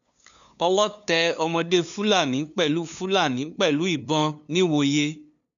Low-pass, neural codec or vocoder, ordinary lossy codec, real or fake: 7.2 kHz; codec, 16 kHz, 2 kbps, FunCodec, trained on LibriTTS, 25 frames a second; none; fake